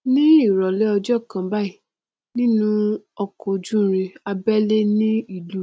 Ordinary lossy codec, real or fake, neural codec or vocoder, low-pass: none; real; none; none